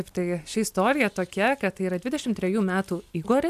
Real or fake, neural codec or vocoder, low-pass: real; none; 14.4 kHz